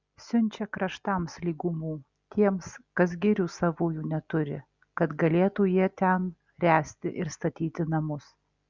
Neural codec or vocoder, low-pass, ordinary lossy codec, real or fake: none; 7.2 kHz; Opus, 64 kbps; real